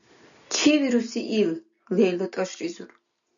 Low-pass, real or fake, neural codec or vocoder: 7.2 kHz; real; none